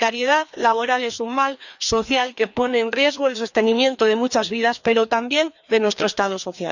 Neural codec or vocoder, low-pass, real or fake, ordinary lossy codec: codec, 16 kHz, 2 kbps, FreqCodec, larger model; 7.2 kHz; fake; none